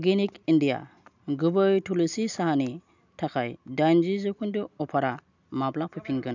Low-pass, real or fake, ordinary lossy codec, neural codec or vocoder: 7.2 kHz; real; none; none